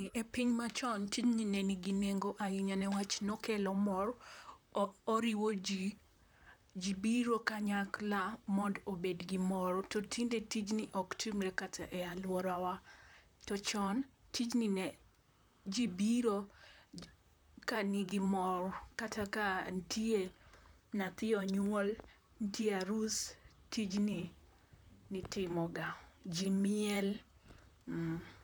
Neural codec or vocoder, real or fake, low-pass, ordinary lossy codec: vocoder, 44.1 kHz, 128 mel bands, Pupu-Vocoder; fake; none; none